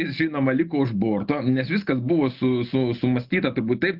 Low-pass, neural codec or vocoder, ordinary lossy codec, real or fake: 5.4 kHz; none; Opus, 24 kbps; real